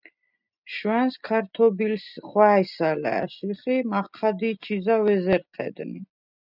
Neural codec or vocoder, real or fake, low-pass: none; real; 5.4 kHz